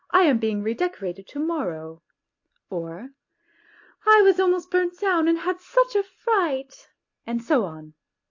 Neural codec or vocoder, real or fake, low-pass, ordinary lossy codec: none; real; 7.2 kHz; AAC, 48 kbps